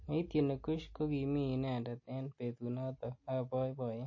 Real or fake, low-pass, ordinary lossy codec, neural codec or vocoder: real; 7.2 kHz; MP3, 32 kbps; none